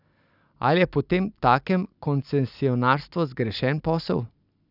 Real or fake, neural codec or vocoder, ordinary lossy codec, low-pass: real; none; none; 5.4 kHz